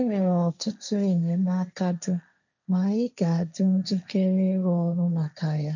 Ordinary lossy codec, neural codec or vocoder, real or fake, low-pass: none; codec, 16 kHz, 1.1 kbps, Voila-Tokenizer; fake; 7.2 kHz